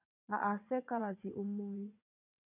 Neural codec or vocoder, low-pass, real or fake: vocoder, 22.05 kHz, 80 mel bands, WaveNeXt; 3.6 kHz; fake